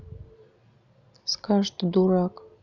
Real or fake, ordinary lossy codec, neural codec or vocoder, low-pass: real; none; none; 7.2 kHz